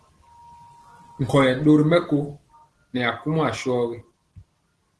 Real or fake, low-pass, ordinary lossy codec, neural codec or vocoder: real; 10.8 kHz; Opus, 16 kbps; none